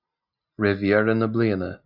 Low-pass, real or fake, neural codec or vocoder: 5.4 kHz; real; none